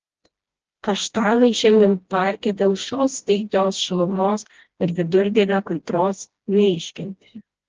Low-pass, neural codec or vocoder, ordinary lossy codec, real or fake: 7.2 kHz; codec, 16 kHz, 1 kbps, FreqCodec, smaller model; Opus, 16 kbps; fake